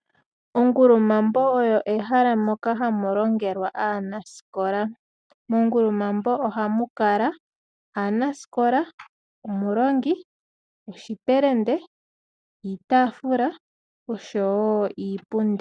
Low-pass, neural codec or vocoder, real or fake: 9.9 kHz; none; real